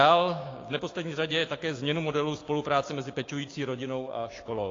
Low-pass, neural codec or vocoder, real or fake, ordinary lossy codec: 7.2 kHz; codec, 16 kHz, 6 kbps, DAC; fake; AAC, 32 kbps